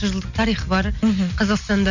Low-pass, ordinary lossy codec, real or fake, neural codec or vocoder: 7.2 kHz; none; real; none